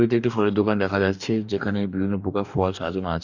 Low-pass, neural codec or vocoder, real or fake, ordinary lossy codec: 7.2 kHz; codec, 44.1 kHz, 2.6 kbps, DAC; fake; none